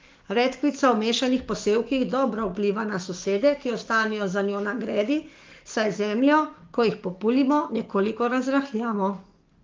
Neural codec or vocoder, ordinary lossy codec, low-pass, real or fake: codec, 44.1 kHz, 7.8 kbps, DAC; Opus, 32 kbps; 7.2 kHz; fake